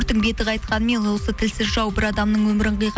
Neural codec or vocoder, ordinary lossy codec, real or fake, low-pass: none; none; real; none